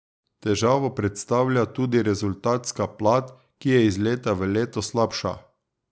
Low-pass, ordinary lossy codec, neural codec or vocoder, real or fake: none; none; none; real